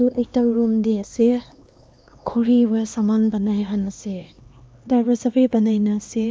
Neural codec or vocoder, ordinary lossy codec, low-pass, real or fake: codec, 16 kHz, 2 kbps, X-Codec, HuBERT features, trained on LibriSpeech; none; none; fake